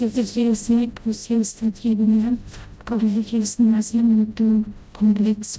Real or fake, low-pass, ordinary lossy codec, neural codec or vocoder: fake; none; none; codec, 16 kHz, 0.5 kbps, FreqCodec, smaller model